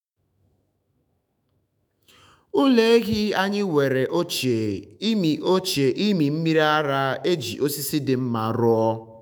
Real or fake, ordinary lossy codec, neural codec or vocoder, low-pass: fake; none; autoencoder, 48 kHz, 128 numbers a frame, DAC-VAE, trained on Japanese speech; none